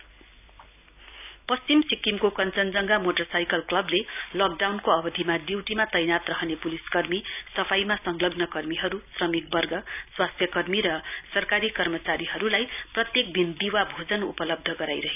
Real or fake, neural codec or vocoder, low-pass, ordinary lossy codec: real; none; 3.6 kHz; none